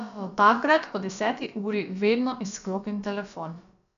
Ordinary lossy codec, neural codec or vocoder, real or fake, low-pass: none; codec, 16 kHz, about 1 kbps, DyCAST, with the encoder's durations; fake; 7.2 kHz